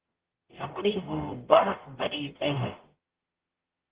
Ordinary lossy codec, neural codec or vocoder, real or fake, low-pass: Opus, 16 kbps; codec, 44.1 kHz, 0.9 kbps, DAC; fake; 3.6 kHz